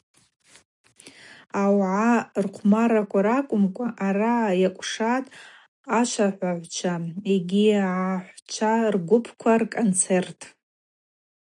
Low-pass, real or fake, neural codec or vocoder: 10.8 kHz; real; none